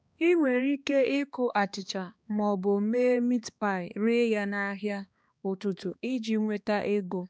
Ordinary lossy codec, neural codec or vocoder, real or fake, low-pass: none; codec, 16 kHz, 2 kbps, X-Codec, HuBERT features, trained on balanced general audio; fake; none